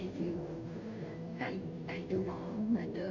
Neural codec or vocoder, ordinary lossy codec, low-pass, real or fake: codec, 16 kHz, 0.5 kbps, FunCodec, trained on Chinese and English, 25 frames a second; none; 7.2 kHz; fake